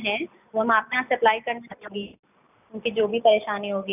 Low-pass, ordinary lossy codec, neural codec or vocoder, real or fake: 3.6 kHz; none; none; real